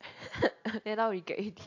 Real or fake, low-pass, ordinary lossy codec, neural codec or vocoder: real; 7.2 kHz; AAC, 48 kbps; none